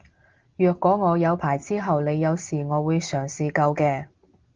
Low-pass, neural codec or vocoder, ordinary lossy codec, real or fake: 7.2 kHz; none; Opus, 16 kbps; real